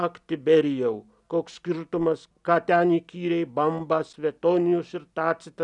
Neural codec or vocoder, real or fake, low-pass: none; real; 10.8 kHz